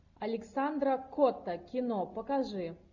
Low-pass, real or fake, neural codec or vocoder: 7.2 kHz; real; none